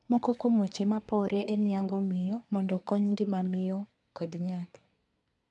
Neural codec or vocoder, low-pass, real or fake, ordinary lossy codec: codec, 24 kHz, 1 kbps, SNAC; 10.8 kHz; fake; none